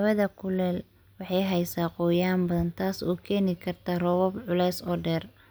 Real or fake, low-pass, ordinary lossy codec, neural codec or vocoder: real; none; none; none